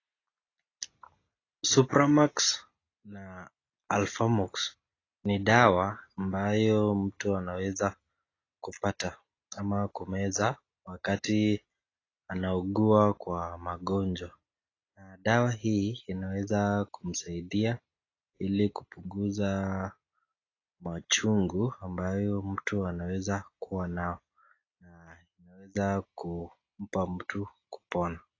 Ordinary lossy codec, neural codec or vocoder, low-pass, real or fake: AAC, 32 kbps; none; 7.2 kHz; real